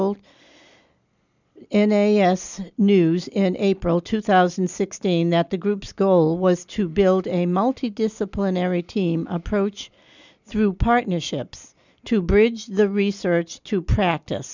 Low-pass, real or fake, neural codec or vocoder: 7.2 kHz; real; none